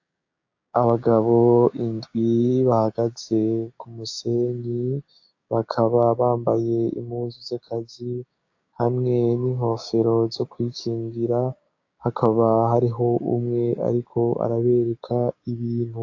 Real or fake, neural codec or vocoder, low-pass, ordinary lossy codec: fake; codec, 16 kHz, 6 kbps, DAC; 7.2 kHz; AAC, 48 kbps